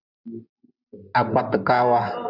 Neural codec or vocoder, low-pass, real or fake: none; 5.4 kHz; real